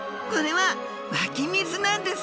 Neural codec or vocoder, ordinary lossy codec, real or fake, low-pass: none; none; real; none